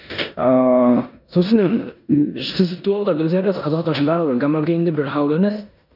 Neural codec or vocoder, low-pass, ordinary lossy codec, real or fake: codec, 16 kHz in and 24 kHz out, 0.9 kbps, LongCat-Audio-Codec, four codebook decoder; 5.4 kHz; none; fake